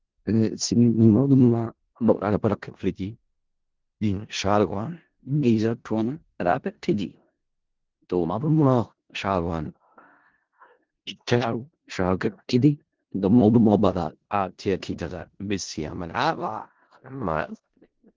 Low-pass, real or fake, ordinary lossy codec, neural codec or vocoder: 7.2 kHz; fake; Opus, 16 kbps; codec, 16 kHz in and 24 kHz out, 0.4 kbps, LongCat-Audio-Codec, four codebook decoder